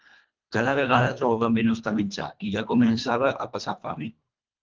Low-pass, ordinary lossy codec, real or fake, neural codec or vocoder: 7.2 kHz; Opus, 24 kbps; fake; codec, 24 kHz, 1.5 kbps, HILCodec